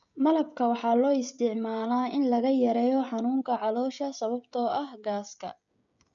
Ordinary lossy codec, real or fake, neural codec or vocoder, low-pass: none; fake; codec, 16 kHz, 16 kbps, FreqCodec, smaller model; 7.2 kHz